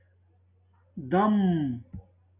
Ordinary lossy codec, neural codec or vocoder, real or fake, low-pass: AAC, 32 kbps; none; real; 3.6 kHz